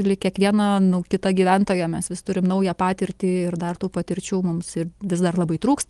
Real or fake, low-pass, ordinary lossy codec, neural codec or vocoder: real; 10.8 kHz; Opus, 24 kbps; none